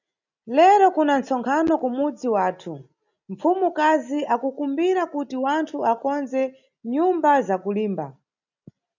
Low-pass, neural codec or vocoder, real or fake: 7.2 kHz; none; real